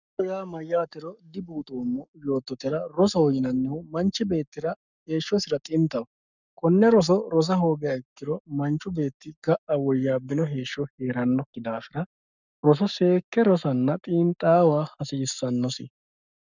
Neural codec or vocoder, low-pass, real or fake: codec, 44.1 kHz, 7.8 kbps, Pupu-Codec; 7.2 kHz; fake